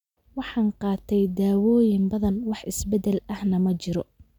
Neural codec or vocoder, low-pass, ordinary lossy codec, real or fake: none; 19.8 kHz; none; real